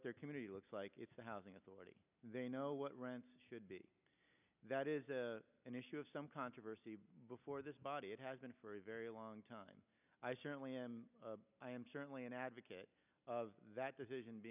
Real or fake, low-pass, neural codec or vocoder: real; 3.6 kHz; none